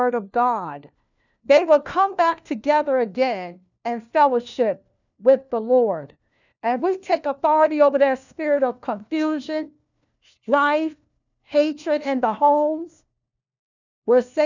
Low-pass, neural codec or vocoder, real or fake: 7.2 kHz; codec, 16 kHz, 1 kbps, FunCodec, trained on LibriTTS, 50 frames a second; fake